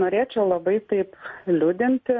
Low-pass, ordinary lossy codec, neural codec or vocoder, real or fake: 7.2 kHz; MP3, 32 kbps; none; real